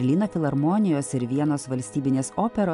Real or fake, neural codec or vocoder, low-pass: real; none; 10.8 kHz